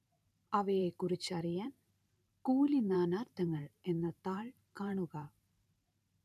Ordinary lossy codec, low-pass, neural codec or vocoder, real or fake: none; 14.4 kHz; vocoder, 44.1 kHz, 128 mel bands every 512 samples, BigVGAN v2; fake